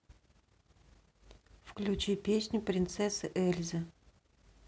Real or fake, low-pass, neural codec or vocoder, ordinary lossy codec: real; none; none; none